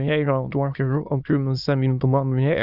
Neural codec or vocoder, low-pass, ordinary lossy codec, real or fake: autoencoder, 22.05 kHz, a latent of 192 numbers a frame, VITS, trained on many speakers; 5.4 kHz; Opus, 64 kbps; fake